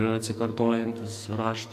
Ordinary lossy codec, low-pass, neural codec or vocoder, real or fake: AAC, 96 kbps; 14.4 kHz; codec, 44.1 kHz, 2.6 kbps, SNAC; fake